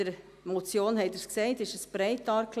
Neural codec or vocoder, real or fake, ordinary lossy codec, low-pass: none; real; AAC, 96 kbps; 14.4 kHz